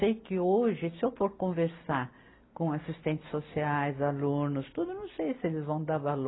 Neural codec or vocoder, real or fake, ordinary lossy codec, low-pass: none; real; AAC, 16 kbps; 7.2 kHz